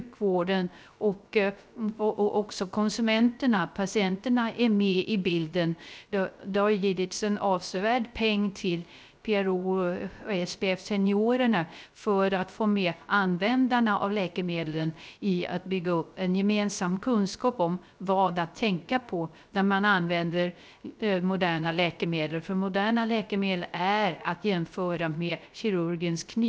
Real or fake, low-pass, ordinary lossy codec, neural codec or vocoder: fake; none; none; codec, 16 kHz, 0.3 kbps, FocalCodec